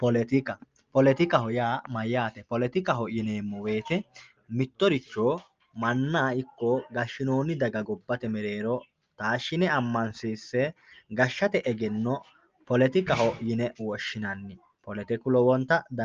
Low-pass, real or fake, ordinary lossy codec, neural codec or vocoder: 7.2 kHz; real; Opus, 24 kbps; none